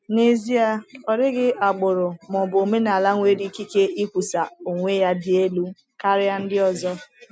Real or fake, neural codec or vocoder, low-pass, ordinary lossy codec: real; none; none; none